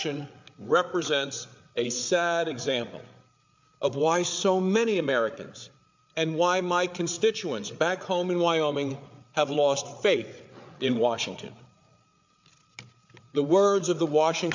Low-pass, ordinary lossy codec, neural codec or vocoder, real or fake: 7.2 kHz; MP3, 64 kbps; codec, 16 kHz, 8 kbps, FreqCodec, larger model; fake